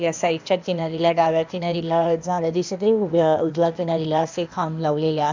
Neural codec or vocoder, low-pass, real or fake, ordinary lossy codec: codec, 16 kHz, 0.8 kbps, ZipCodec; 7.2 kHz; fake; MP3, 64 kbps